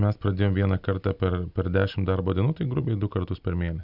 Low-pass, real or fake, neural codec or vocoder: 5.4 kHz; real; none